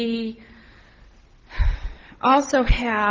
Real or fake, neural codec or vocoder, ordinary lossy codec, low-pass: real; none; Opus, 16 kbps; 7.2 kHz